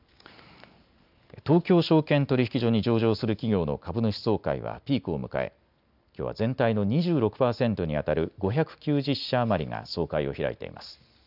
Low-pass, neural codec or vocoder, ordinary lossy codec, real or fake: 5.4 kHz; none; none; real